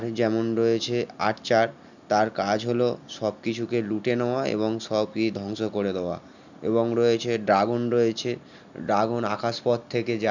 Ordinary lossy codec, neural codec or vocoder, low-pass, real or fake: none; none; 7.2 kHz; real